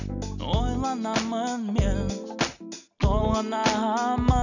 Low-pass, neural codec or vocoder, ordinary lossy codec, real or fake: 7.2 kHz; none; none; real